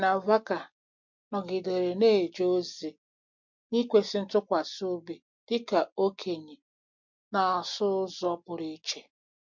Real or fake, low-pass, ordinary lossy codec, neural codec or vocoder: real; 7.2 kHz; MP3, 48 kbps; none